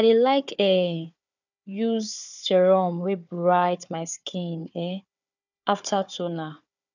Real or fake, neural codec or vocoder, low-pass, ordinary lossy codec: fake; codec, 16 kHz, 4 kbps, FunCodec, trained on Chinese and English, 50 frames a second; 7.2 kHz; none